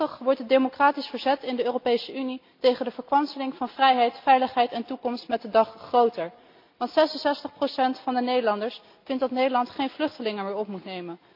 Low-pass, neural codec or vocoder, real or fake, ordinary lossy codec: 5.4 kHz; none; real; MP3, 48 kbps